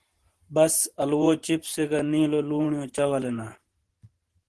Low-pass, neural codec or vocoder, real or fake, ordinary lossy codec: 10.8 kHz; vocoder, 44.1 kHz, 128 mel bands every 512 samples, BigVGAN v2; fake; Opus, 16 kbps